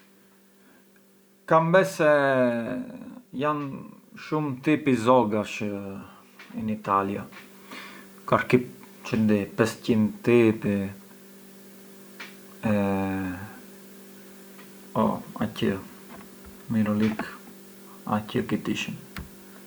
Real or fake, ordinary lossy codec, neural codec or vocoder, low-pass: real; none; none; none